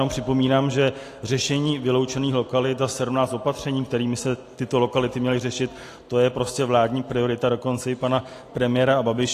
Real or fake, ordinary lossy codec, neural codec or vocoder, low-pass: real; AAC, 48 kbps; none; 14.4 kHz